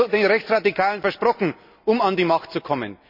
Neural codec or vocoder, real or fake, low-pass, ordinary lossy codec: none; real; 5.4 kHz; AAC, 48 kbps